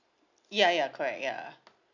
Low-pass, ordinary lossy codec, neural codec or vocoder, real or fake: 7.2 kHz; none; none; real